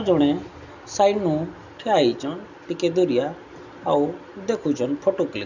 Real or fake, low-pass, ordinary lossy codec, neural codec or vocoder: real; 7.2 kHz; none; none